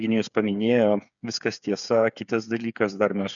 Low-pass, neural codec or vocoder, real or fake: 7.2 kHz; codec, 16 kHz, 8 kbps, FreqCodec, smaller model; fake